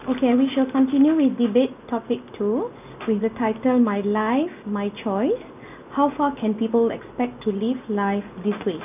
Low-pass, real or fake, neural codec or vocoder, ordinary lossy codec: 3.6 kHz; real; none; none